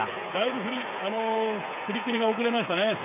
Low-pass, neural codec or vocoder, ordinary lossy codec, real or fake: 3.6 kHz; codec, 16 kHz, 8 kbps, FreqCodec, smaller model; none; fake